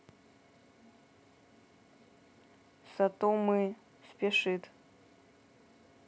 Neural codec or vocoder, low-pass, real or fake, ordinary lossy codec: none; none; real; none